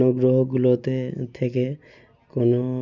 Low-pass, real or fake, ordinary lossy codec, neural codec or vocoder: 7.2 kHz; real; none; none